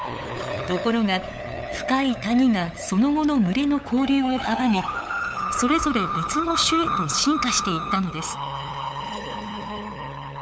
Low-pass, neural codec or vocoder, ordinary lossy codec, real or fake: none; codec, 16 kHz, 8 kbps, FunCodec, trained on LibriTTS, 25 frames a second; none; fake